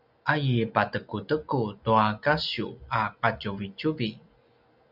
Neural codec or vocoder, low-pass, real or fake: none; 5.4 kHz; real